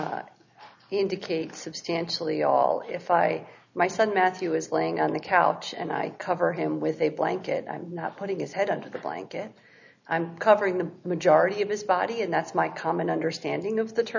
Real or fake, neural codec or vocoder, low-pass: real; none; 7.2 kHz